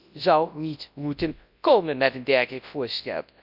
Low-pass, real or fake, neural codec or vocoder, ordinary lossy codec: 5.4 kHz; fake; codec, 24 kHz, 0.9 kbps, WavTokenizer, large speech release; none